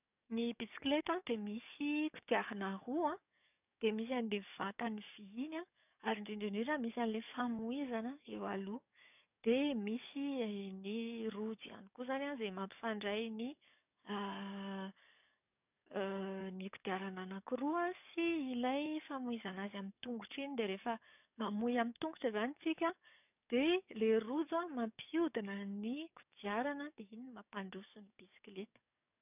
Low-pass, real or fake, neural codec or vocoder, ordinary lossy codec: 3.6 kHz; fake; codec, 44.1 kHz, 7.8 kbps, DAC; none